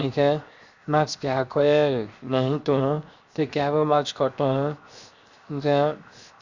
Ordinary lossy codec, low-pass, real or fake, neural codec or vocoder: Opus, 64 kbps; 7.2 kHz; fake; codec, 16 kHz, 0.7 kbps, FocalCodec